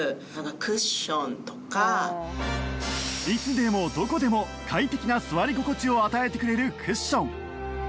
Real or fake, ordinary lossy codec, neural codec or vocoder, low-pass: real; none; none; none